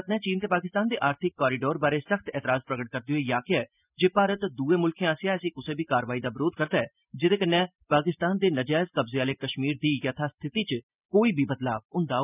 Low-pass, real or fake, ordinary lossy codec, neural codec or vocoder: 3.6 kHz; real; none; none